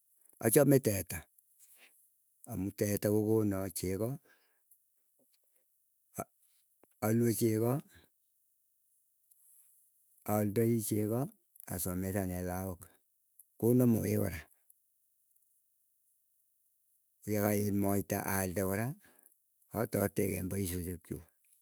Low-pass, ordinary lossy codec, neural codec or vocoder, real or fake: none; none; autoencoder, 48 kHz, 128 numbers a frame, DAC-VAE, trained on Japanese speech; fake